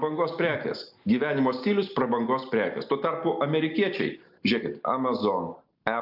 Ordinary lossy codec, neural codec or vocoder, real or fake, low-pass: AAC, 48 kbps; none; real; 5.4 kHz